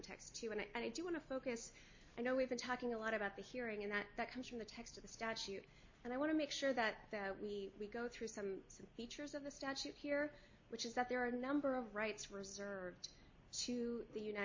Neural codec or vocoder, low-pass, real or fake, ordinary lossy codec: none; 7.2 kHz; real; MP3, 32 kbps